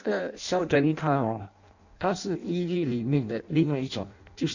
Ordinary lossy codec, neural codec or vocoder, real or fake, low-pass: none; codec, 16 kHz in and 24 kHz out, 0.6 kbps, FireRedTTS-2 codec; fake; 7.2 kHz